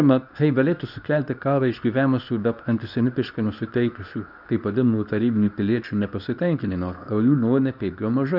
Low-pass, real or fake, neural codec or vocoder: 5.4 kHz; fake; codec, 24 kHz, 0.9 kbps, WavTokenizer, medium speech release version 2